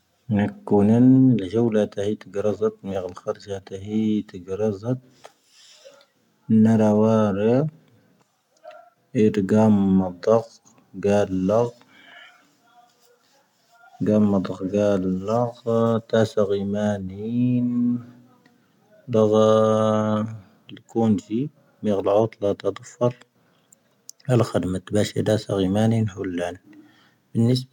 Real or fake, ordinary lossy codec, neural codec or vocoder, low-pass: real; none; none; 19.8 kHz